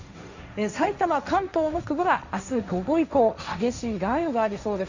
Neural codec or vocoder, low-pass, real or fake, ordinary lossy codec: codec, 16 kHz, 1.1 kbps, Voila-Tokenizer; 7.2 kHz; fake; Opus, 64 kbps